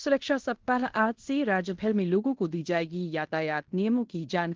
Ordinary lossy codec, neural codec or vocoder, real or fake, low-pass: Opus, 16 kbps; codec, 16 kHz in and 24 kHz out, 0.9 kbps, LongCat-Audio-Codec, fine tuned four codebook decoder; fake; 7.2 kHz